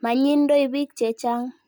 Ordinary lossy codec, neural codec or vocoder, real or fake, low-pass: none; none; real; none